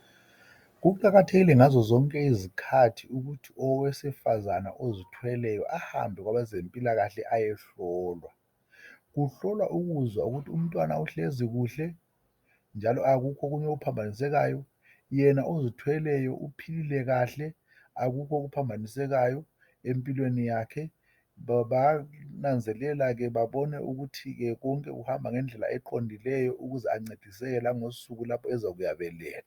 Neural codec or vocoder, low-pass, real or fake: none; 19.8 kHz; real